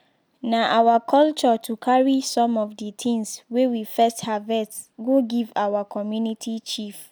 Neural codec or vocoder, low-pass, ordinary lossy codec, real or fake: none; none; none; real